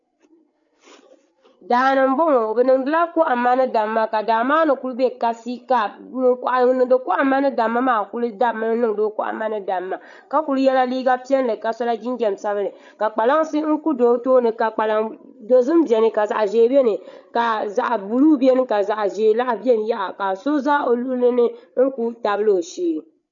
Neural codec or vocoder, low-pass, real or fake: codec, 16 kHz, 8 kbps, FreqCodec, larger model; 7.2 kHz; fake